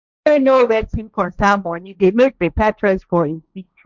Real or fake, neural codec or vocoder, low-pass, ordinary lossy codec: fake; codec, 16 kHz, 1.1 kbps, Voila-Tokenizer; 7.2 kHz; none